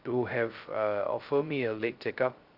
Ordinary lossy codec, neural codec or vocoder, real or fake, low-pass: Opus, 32 kbps; codec, 16 kHz, 0.2 kbps, FocalCodec; fake; 5.4 kHz